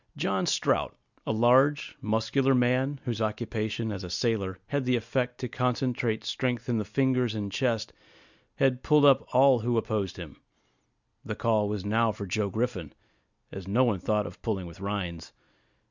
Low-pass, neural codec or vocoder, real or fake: 7.2 kHz; none; real